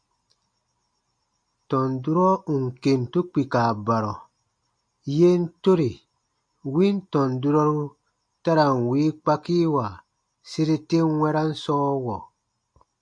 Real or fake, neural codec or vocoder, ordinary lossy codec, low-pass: real; none; MP3, 48 kbps; 9.9 kHz